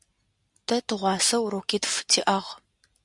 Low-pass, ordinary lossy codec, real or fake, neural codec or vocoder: 10.8 kHz; Opus, 64 kbps; real; none